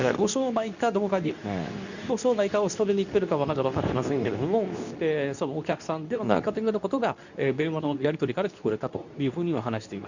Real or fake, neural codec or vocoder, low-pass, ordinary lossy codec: fake; codec, 24 kHz, 0.9 kbps, WavTokenizer, medium speech release version 2; 7.2 kHz; none